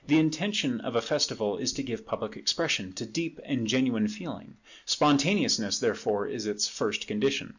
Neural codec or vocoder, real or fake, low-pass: none; real; 7.2 kHz